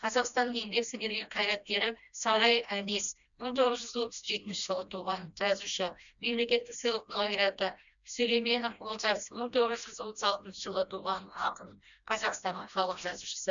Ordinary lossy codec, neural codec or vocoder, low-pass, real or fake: none; codec, 16 kHz, 1 kbps, FreqCodec, smaller model; 7.2 kHz; fake